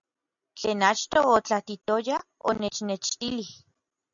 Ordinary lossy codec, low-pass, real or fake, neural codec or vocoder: MP3, 96 kbps; 7.2 kHz; real; none